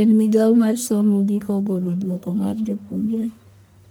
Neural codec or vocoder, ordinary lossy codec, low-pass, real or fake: codec, 44.1 kHz, 1.7 kbps, Pupu-Codec; none; none; fake